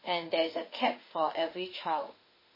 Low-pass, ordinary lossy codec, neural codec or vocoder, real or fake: 5.4 kHz; MP3, 24 kbps; autoencoder, 48 kHz, 32 numbers a frame, DAC-VAE, trained on Japanese speech; fake